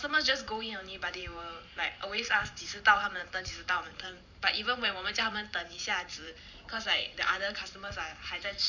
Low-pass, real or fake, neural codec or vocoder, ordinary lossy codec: 7.2 kHz; fake; vocoder, 44.1 kHz, 128 mel bands every 512 samples, BigVGAN v2; none